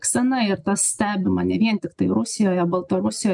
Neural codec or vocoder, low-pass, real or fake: none; 10.8 kHz; real